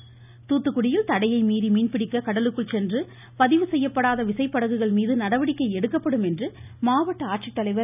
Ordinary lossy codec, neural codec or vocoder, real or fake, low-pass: none; none; real; 3.6 kHz